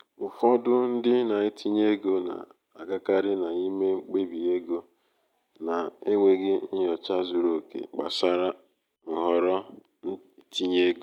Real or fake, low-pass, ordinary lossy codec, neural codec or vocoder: real; 19.8 kHz; none; none